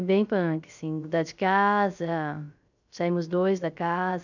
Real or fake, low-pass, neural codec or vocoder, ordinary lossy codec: fake; 7.2 kHz; codec, 16 kHz, 0.3 kbps, FocalCodec; none